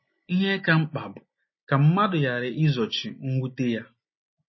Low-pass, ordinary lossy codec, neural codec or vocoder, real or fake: 7.2 kHz; MP3, 24 kbps; none; real